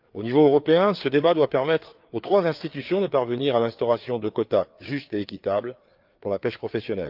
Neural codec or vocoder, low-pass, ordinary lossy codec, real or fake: codec, 16 kHz, 4 kbps, FreqCodec, larger model; 5.4 kHz; Opus, 32 kbps; fake